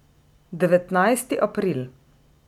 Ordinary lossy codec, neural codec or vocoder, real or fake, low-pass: none; none; real; 19.8 kHz